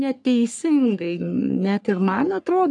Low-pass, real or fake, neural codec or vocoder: 10.8 kHz; fake; codec, 44.1 kHz, 3.4 kbps, Pupu-Codec